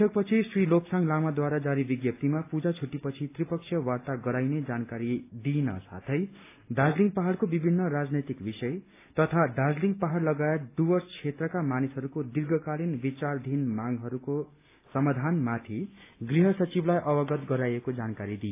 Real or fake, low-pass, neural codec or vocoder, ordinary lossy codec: real; 3.6 kHz; none; AAC, 24 kbps